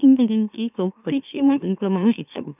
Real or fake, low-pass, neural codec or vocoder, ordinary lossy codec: fake; 3.6 kHz; autoencoder, 44.1 kHz, a latent of 192 numbers a frame, MeloTTS; none